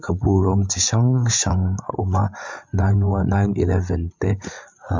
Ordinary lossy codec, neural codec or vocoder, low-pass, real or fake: MP3, 64 kbps; codec, 16 kHz, 8 kbps, FreqCodec, larger model; 7.2 kHz; fake